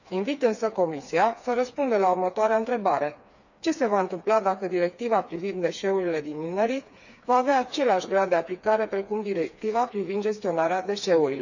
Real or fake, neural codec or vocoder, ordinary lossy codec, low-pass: fake; codec, 16 kHz, 4 kbps, FreqCodec, smaller model; none; 7.2 kHz